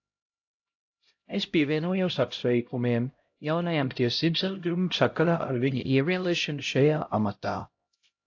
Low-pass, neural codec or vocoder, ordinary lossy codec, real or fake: 7.2 kHz; codec, 16 kHz, 0.5 kbps, X-Codec, HuBERT features, trained on LibriSpeech; AAC, 48 kbps; fake